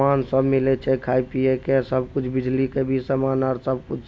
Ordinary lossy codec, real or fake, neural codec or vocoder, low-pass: none; real; none; none